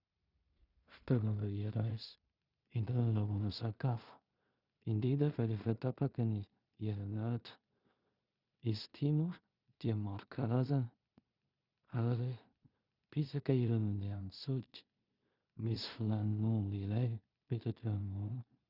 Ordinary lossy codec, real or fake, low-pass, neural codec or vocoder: Opus, 64 kbps; fake; 5.4 kHz; codec, 16 kHz in and 24 kHz out, 0.4 kbps, LongCat-Audio-Codec, two codebook decoder